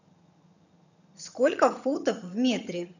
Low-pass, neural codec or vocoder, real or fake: 7.2 kHz; vocoder, 22.05 kHz, 80 mel bands, HiFi-GAN; fake